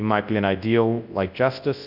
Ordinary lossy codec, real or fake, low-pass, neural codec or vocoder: AAC, 48 kbps; fake; 5.4 kHz; codec, 24 kHz, 0.9 kbps, WavTokenizer, large speech release